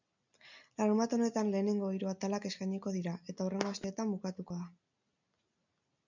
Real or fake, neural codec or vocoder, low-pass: real; none; 7.2 kHz